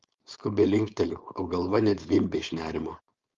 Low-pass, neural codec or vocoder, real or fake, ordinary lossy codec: 7.2 kHz; codec, 16 kHz, 4.8 kbps, FACodec; fake; Opus, 32 kbps